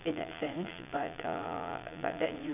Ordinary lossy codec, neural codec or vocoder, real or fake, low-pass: none; vocoder, 22.05 kHz, 80 mel bands, Vocos; fake; 3.6 kHz